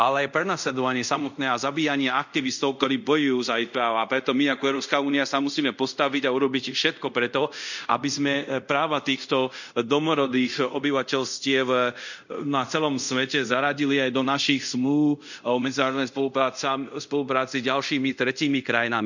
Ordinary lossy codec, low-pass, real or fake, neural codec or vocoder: none; 7.2 kHz; fake; codec, 24 kHz, 0.5 kbps, DualCodec